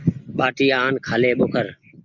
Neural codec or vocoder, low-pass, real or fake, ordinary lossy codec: none; 7.2 kHz; real; Opus, 64 kbps